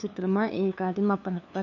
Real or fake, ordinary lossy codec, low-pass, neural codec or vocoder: fake; none; 7.2 kHz; codec, 16 kHz, 2 kbps, FunCodec, trained on LibriTTS, 25 frames a second